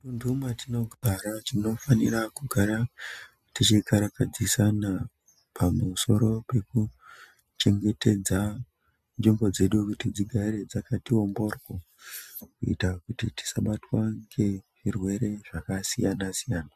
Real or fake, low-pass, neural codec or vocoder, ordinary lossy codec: real; 14.4 kHz; none; MP3, 96 kbps